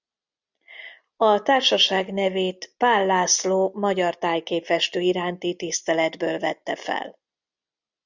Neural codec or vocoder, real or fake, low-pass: none; real; 7.2 kHz